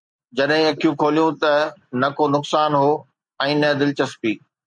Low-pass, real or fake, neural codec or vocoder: 9.9 kHz; real; none